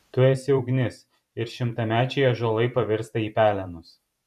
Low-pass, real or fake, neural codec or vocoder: 14.4 kHz; fake; vocoder, 44.1 kHz, 128 mel bands every 512 samples, BigVGAN v2